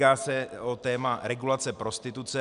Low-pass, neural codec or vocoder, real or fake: 10.8 kHz; none; real